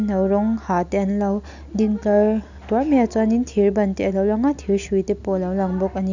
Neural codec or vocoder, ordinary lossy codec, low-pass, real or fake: none; none; 7.2 kHz; real